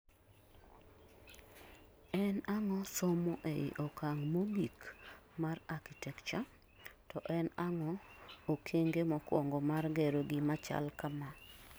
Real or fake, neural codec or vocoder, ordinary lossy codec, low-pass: real; none; none; none